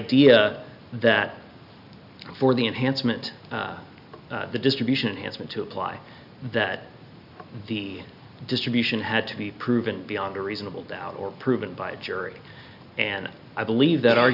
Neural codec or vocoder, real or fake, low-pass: none; real; 5.4 kHz